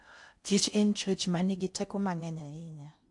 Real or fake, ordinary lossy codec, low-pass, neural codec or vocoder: fake; AAC, 64 kbps; 10.8 kHz; codec, 16 kHz in and 24 kHz out, 0.6 kbps, FocalCodec, streaming, 4096 codes